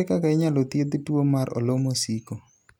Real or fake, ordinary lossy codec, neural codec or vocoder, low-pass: real; none; none; 19.8 kHz